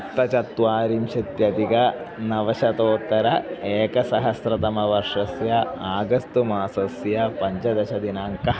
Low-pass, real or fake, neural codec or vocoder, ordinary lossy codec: none; real; none; none